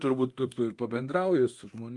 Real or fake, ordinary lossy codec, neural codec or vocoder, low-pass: fake; Opus, 24 kbps; codec, 24 kHz, 0.9 kbps, DualCodec; 10.8 kHz